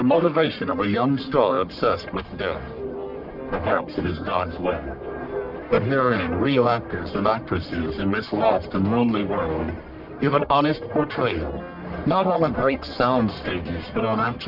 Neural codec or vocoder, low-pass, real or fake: codec, 44.1 kHz, 1.7 kbps, Pupu-Codec; 5.4 kHz; fake